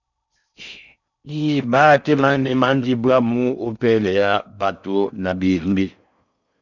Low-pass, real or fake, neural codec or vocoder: 7.2 kHz; fake; codec, 16 kHz in and 24 kHz out, 0.6 kbps, FocalCodec, streaming, 4096 codes